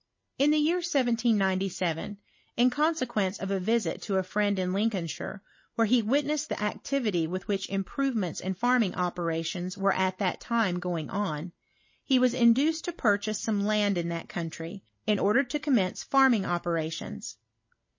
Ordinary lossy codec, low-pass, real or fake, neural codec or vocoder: MP3, 32 kbps; 7.2 kHz; real; none